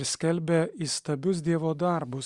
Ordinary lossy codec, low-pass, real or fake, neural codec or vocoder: Opus, 64 kbps; 10.8 kHz; real; none